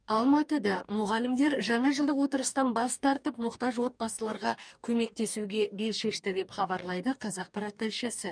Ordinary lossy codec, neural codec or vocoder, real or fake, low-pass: none; codec, 44.1 kHz, 2.6 kbps, DAC; fake; 9.9 kHz